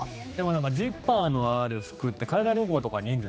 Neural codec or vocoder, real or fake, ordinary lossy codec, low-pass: codec, 16 kHz, 2 kbps, X-Codec, HuBERT features, trained on general audio; fake; none; none